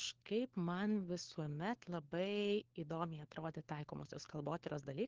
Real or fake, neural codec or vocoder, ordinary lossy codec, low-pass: fake; codec, 16 kHz, 4 kbps, FunCodec, trained on LibriTTS, 50 frames a second; Opus, 16 kbps; 7.2 kHz